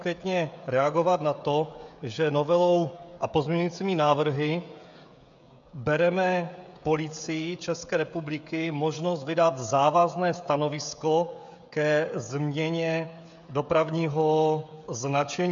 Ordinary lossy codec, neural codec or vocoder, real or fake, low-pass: MP3, 64 kbps; codec, 16 kHz, 16 kbps, FreqCodec, smaller model; fake; 7.2 kHz